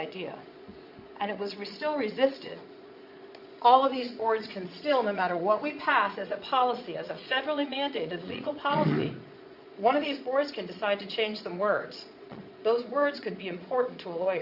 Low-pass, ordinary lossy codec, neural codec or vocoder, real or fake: 5.4 kHz; Opus, 64 kbps; vocoder, 22.05 kHz, 80 mel bands, Vocos; fake